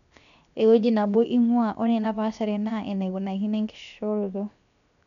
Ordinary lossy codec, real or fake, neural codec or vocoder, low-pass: none; fake; codec, 16 kHz, 0.7 kbps, FocalCodec; 7.2 kHz